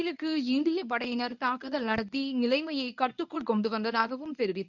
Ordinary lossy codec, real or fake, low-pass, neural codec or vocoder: none; fake; 7.2 kHz; codec, 24 kHz, 0.9 kbps, WavTokenizer, medium speech release version 1